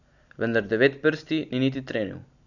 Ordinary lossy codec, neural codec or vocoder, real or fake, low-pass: none; none; real; 7.2 kHz